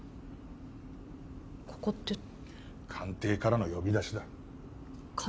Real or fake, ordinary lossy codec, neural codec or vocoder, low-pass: real; none; none; none